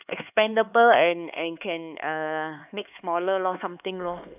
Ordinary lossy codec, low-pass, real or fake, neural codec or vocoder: none; 3.6 kHz; fake; codec, 16 kHz, 4 kbps, X-Codec, HuBERT features, trained on LibriSpeech